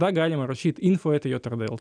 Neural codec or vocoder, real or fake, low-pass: none; real; 9.9 kHz